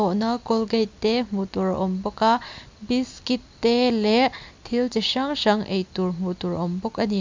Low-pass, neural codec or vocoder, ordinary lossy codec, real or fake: 7.2 kHz; none; MP3, 64 kbps; real